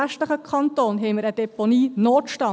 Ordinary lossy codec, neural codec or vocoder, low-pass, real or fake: none; none; none; real